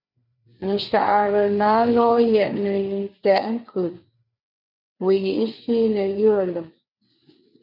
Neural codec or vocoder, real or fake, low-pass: codec, 16 kHz, 4 kbps, FreqCodec, larger model; fake; 5.4 kHz